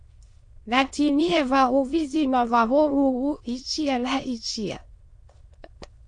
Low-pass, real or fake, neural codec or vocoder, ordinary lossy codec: 9.9 kHz; fake; autoencoder, 22.05 kHz, a latent of 192 numbers a frame, VITS, trained on many speakers; MP3, 48 kbps